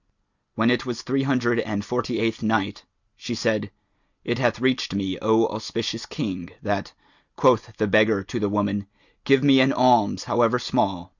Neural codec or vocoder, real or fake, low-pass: none; real; 7.2 kHz